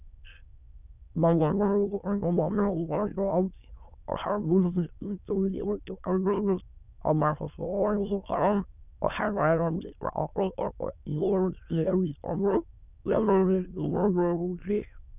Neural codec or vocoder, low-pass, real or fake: autoencoder, 22.05 kHz, a latent of 192 numbers a frame, VITS, trained on many speakers; 3.6 kHz; fake